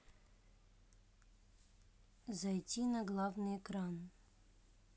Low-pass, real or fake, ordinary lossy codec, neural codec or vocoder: none; real; none; none